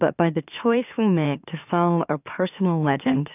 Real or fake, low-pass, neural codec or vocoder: fake; 3.6 kHz; autoencoder, 44.1 kHz, a latent of 192 numbers a frame, MeloTTS